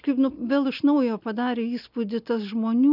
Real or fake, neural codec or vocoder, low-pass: real; none; 5.4 kHz